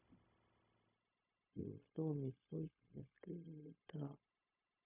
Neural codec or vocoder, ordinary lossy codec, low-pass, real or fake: codec, 16 kHz, 0.4 kbps, LongCat-Audio-Codec; none; 3.6 kHz; fake